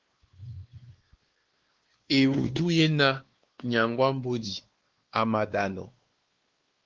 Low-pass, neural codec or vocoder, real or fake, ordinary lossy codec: 7.2 kHz; codec, 16 kHz, 2 kbps, X-Codec, WavLM features, trained on Multilingual LibriSpeech; fake; Opus, 16 kbps